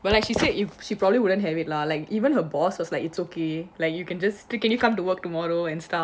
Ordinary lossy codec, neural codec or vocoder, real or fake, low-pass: none; none; real; none